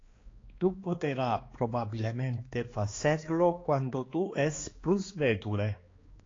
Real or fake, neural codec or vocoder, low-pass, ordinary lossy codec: fake; codec, 16 kHz, 2 kbps, X-Codec, HuBERT features, trained on balanced general audio; 7.2 kHz; AAC, 32 kbps